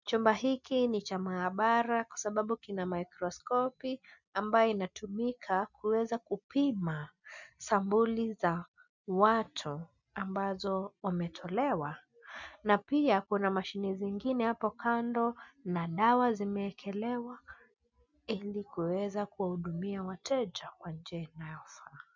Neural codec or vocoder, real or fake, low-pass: none; real; 7.2 kHz